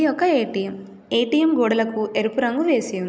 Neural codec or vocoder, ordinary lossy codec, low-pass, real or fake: none; none; none; real